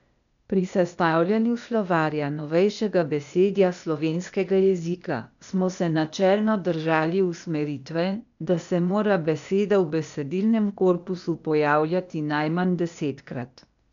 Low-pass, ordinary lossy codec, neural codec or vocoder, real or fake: 7.2 kHz; none; codec, 16 kHz, 0.8 kbps, ZipCodec; fake